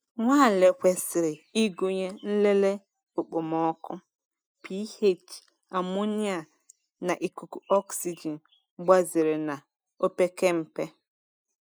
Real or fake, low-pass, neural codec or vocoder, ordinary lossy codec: real; none; none; none